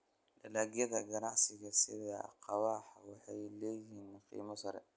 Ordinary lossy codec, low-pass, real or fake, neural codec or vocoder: none; none; real; none